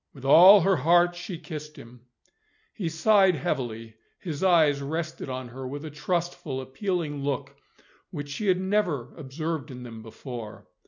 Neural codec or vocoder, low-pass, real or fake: none; 7.2 kHz; real